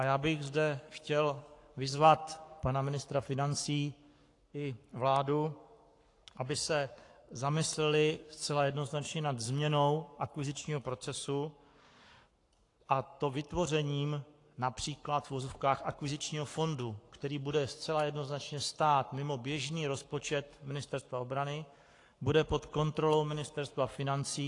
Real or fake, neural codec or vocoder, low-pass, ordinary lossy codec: fake; codec, 44.1 kHz, 7.8 kbps, Pupu-Codec; 10.8 kHz; AAC, 48 kbps